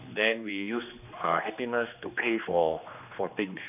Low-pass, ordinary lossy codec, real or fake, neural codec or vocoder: 3.6 kHz; none; fake; codec, 16 kHz, 2 kbps, X-Codec, HuBERT features, trained on general audio